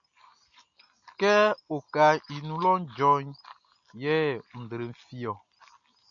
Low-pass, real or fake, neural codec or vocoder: 7.2 kHz; real; none